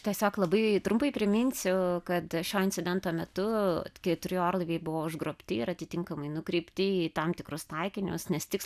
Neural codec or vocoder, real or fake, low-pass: none; real; 14.4 kHz